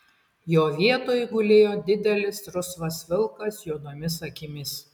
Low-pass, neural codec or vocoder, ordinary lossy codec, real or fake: 19.8 kHz; none; MP3, 96 kbps; real